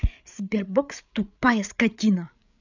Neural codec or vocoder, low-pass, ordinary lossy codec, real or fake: codec, 16 kHz, 8 kbps, FreqCodec, larger model; 7.2 kHz; none; fake